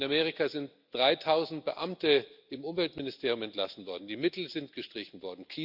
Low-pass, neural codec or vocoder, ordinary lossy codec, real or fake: 5.4 kHz; none; Opus, 64 kbps; real